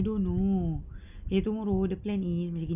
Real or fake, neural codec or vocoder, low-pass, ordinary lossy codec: real; none; 3.6 kHz; none